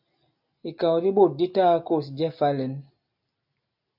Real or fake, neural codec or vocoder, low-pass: real; none; 5.4 kHz